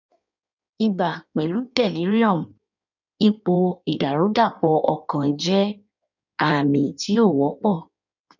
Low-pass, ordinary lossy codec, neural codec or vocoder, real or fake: 7.2 kHz; none; codec, 16 kHz in and 24 kHz out, 1.1 kbps, FireRedTTS-2 codec; fake